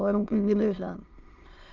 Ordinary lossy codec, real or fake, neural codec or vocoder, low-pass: Opus, 32 kbps; fake; autoencoder, 22.05 kHz, a latent of 192 numbers a frame, VITS, trained on many speakers; 7.2 kHz